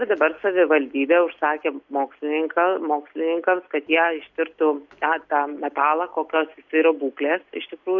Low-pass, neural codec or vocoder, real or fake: 7.2 kHz; none; real